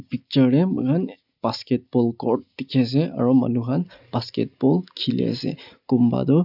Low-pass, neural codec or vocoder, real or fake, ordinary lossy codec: 5.4 kHz; none; real; none